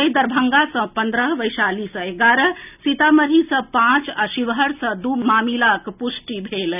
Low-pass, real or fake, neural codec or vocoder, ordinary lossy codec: 3.6 kHz; real; none; none